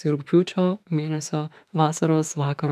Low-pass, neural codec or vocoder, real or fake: 14.4 kHz; autoencoder, 48 kHz, 32 numbers a frame, DAC-VAE, trained on Japanese speech; fake